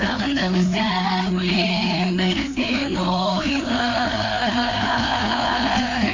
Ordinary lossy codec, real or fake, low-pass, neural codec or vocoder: MP3, 48 kbps; fake; 7.2 kHz; codec, 16 kHz, 2 kbps, FreqCodec, larger model